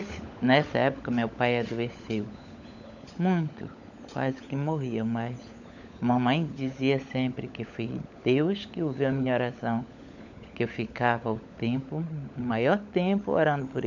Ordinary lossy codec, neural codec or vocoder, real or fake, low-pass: none; codec, 16 kHz, 16 kbps, FunCodec, trained on LibriTTS, 50 frames a second; fake; 7.2 kHz